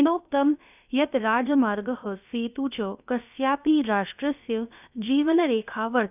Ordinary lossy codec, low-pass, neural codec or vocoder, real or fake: none; 3.6 kHz; codec, 16 kHz, about 1 kbps, DyCAST, with the encoder's durations; fake